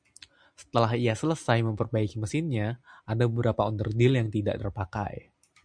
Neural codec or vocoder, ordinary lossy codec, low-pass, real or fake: none; MP3, 96 kbps; 9.9 kHz; real